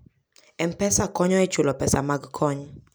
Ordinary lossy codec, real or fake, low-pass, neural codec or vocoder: none; real; none; none